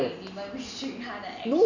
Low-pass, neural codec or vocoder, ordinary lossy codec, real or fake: 7.2 kHz; none; none; real